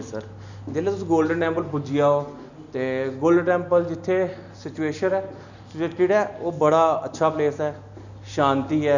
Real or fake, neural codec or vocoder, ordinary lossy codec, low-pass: real; none; none; 7.2 kHz